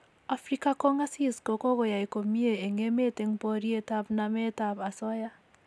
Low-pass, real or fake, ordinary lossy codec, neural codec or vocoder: none; real; none; none